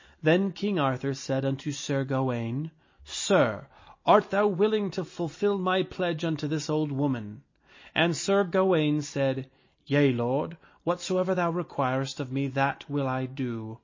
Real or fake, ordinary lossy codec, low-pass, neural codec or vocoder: real; MP3, 32 kbps; 7.2 kHz; none